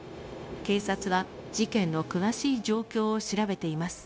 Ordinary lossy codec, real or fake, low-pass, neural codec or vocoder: none; fake; none; codec, 16 kHz, 0.9 kbps, LongCat-Audio-Codec